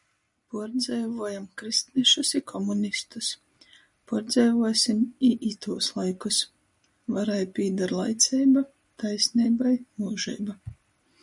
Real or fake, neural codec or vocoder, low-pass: real; none; 10.8 kHz